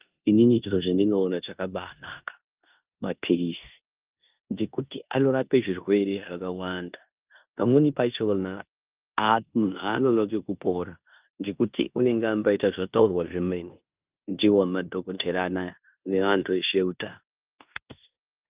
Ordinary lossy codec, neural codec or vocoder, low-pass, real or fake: Opus, 24 kbps; codec, 16 kHz in and 24 kHz out, 0.9 kbps, LongCat-Audio-Codec, four codebook decoder; 3.6 kHz; fake